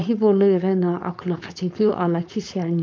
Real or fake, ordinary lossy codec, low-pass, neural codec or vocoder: fake; none; none; codec, 16 kHz, 4.8 kbps, FACodec